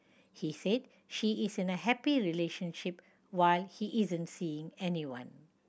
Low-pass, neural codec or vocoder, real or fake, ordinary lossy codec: none; none; real; none